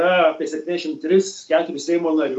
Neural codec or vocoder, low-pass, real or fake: none; 10.8 kHz; real